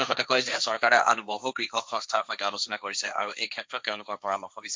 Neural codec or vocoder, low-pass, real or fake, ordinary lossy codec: codec, 16 kHz, 1.1 kbps, Voila-Tokenizer; none; fake; none